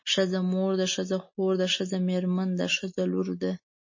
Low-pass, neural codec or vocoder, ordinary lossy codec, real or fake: 7.2 kHz; none; MP3, 32 kbps; real